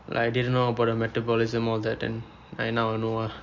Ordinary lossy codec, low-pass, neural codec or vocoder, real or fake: MP3, 48 kbps; 7.2 kHz; none; real